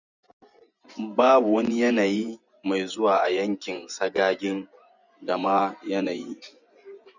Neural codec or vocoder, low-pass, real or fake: vocoder, 24 kHz, 100 mel bands, Vocos; 7.2 kHz; fake